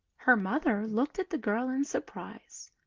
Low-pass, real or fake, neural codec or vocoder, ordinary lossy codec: 7.2 kHz; real; none; Opus, 16 kbps